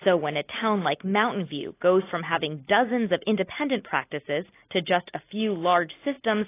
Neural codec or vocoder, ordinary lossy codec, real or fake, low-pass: none; AAC, 24 kbps; real; 3.6 kHz